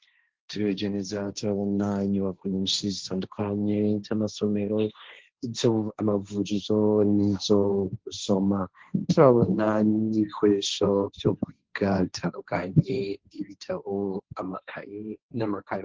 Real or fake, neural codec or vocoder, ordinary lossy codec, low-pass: fake; codec, 16 kHz, 1.1 kbps, Voila-Tokenizer; Opus, 16 kbps; 7.2 kHz